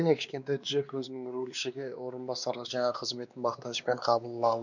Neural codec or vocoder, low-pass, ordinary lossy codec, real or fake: codec, 16 kHz, 2 kbps, X-Codec, WavLM features, trained on Multilingual LibriSpeech; 7.2 kHz; none; fake